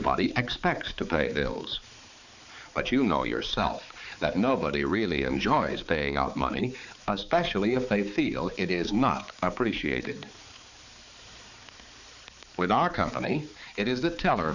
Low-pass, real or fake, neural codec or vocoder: 7.2 kHz; fake; codec, 16 kHz, 4 kbps, X-Codec, HuBERT features, trained on balanced general audio